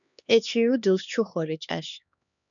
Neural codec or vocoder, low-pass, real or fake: codec, 16 kHz, 2 kbps, X-Codec, HuBERT features, trained on LibriSpeech; 7.2 kHz; fake